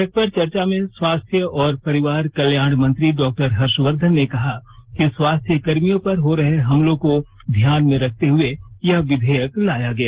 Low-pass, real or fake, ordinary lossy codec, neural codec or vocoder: 3.6 kHz; real; Opus, 16 kbps; none